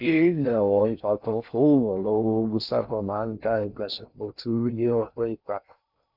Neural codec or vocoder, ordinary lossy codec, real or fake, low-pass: codec, 16 kHz in and 24 kHz out, 0.6 kbps, FocalCodec, streaming, 4096 codes; none; fake; 5.4 kHz